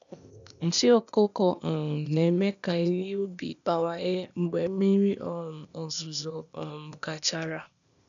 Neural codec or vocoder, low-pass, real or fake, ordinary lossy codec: codec, 16 kHz, 0.8 kbps, ZipCodec; 7.2 kHz; fake; none